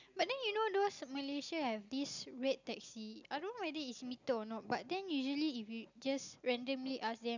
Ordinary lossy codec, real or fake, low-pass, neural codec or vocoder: none; real; 7.2 kHz; none